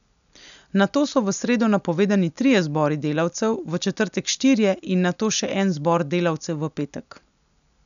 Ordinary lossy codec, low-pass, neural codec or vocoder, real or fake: none; 7.2 kHz; none; real